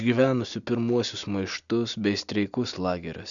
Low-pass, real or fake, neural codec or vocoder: 7.2 kHz; real; none